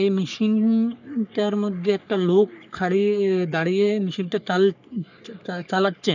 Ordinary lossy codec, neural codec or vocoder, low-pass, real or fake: none; codec, 44.1 kHz, 3.4 kbps, Pupu-Codec; 7.2 kHz; fake